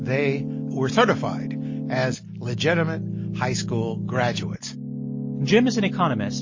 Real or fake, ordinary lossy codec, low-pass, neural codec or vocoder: real; MP3, 32 kbps; 7.2 kHz; none